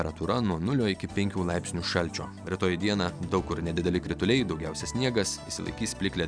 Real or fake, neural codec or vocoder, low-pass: real; none; 9.9 kHz